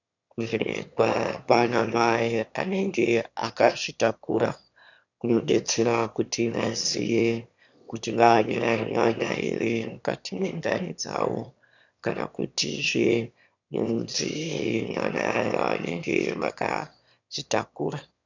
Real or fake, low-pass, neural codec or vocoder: fake; 7.2 kHz; autoencoder, 22.05 kHz, a latent of 192 numbers a frame, VITS, trained on one speaker